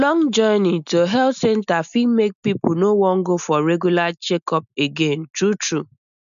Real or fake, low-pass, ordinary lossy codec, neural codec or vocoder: real; 7.2 kHz; none; none